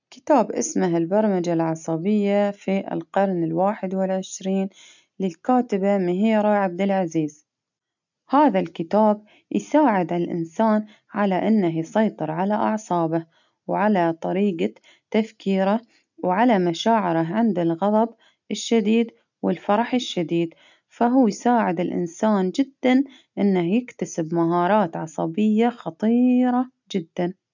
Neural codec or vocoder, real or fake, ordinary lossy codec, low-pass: none; real; none; 7.2 kHz